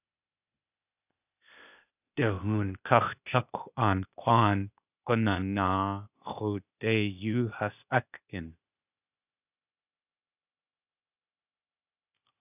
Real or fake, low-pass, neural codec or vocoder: fake; 3.6 kHz; codec, 16 kHz, 0.8 kbps, ZipCodec